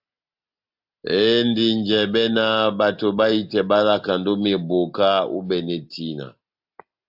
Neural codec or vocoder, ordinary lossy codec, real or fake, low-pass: none; AAC, 48 kbps; real; 5.4 kHz